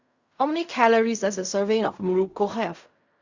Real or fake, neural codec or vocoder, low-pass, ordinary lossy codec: fake; codec, 16 kHz in and 24 kHz out, 0.4 kbps, LongCat-Audio-Codec, fine tuned four codebook decoder; 7.2 kHz; Opus, 64 kbps